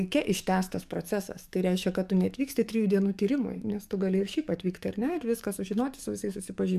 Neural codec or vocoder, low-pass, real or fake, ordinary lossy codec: codec, 44.1 kHz, 7.8 kbps, DAC; 14.4 kHz; fake; MP3, 96 kbps